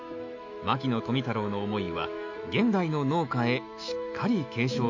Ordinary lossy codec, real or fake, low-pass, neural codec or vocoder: MP3, 48 kbps; fake; 7.2 kHz; autoencoder, 48 kHz, 128 numbers a frame, DAC-VAE, trained on Japanese speech